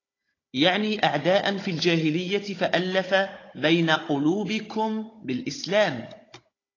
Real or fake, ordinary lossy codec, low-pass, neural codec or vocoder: fake; AAC, 32 kbps; 7.2 kHz; codec, 16 kHz, 16 kbps, FunCodec, trained on Chinese and English, 50 frames a second